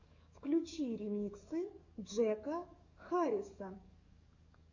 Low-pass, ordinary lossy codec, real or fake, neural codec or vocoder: 7.2 kHz; Opus, 64 kbps; fake; codec, 44.1 kHz, 7.8 kbps, DAC